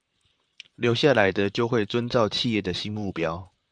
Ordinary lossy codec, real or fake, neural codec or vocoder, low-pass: MP3, 96 kbps; fake; vocoder, 44.1 kHz, 128 mel bands, Pupu-Vocoder; 9.9 kHz